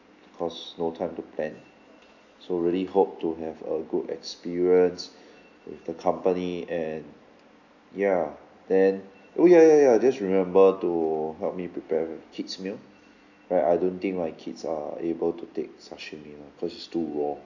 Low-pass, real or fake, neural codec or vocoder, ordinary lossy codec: 7.2 kHz; real; none; none